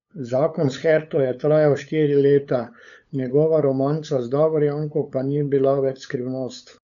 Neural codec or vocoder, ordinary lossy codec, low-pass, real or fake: codec, 16 kHz, 8 kbps, FunCodec, trained on LibriTTS, 25 frames a second; none; 7.2 kHz; fake